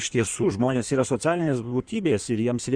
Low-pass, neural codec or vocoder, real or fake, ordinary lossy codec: 9.9 kHz; codec, 16 kHz in and 24 kHz out, 1.1 kbps, FireRedTTS-2 codec; fake; Opus, 64 kbps